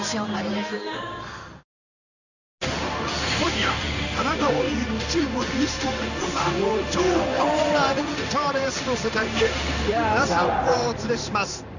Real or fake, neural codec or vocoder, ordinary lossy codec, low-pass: fake; codec, 16 kHz in and 24 kHz out, 1 kbps, XY-Tokenizer; none; 7.2 kHz